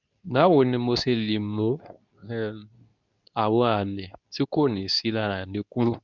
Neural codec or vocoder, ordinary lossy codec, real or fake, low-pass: codec, 24 kHz, 0.9 kbps, WavTokenizer, medium speech release version 2; none; fake; 7.2 kHz